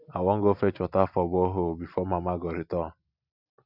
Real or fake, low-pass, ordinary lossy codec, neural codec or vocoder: real; 5.4 kHz; none; none